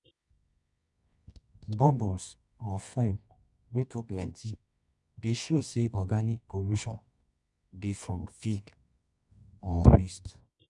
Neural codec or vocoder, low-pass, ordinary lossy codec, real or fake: codec, 24 kHz, 0.9 kbps, WavTokenizer, medium music audio release; 10.8 kHz; none; fake